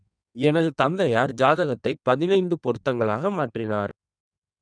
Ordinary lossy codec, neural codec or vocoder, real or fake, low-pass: none; codec, 16 kHz in and 24 kHz out, 1.1 kbps, FireRedTTS-2 codec; fake; 9.9 kHz